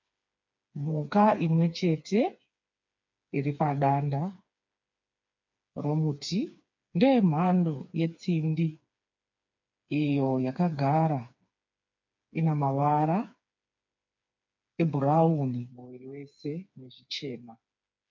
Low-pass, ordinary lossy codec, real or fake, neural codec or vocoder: 7.2 kHz; MP3, 48 kbps; fake; codec, 16 kHz, 4 kbps, FreqCodec, smaller model